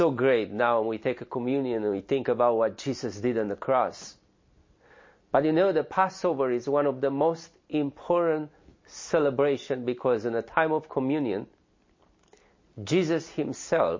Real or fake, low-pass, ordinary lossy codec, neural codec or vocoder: real; 7.2 kHz; MP3, 32 kbps; none